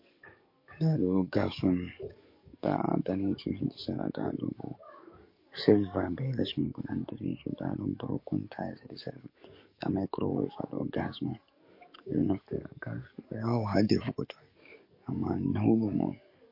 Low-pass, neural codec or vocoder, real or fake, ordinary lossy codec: 5.4 kHz; codec, 44.1 kHz, 7.8 kbps, DAC; fake; MP3, 32 kbps